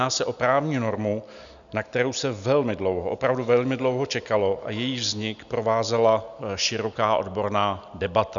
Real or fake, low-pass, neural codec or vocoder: real; 7.2 kHz; none